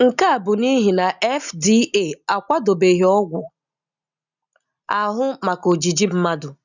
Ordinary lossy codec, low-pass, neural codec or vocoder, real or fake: none; 7.2 kHz; none; real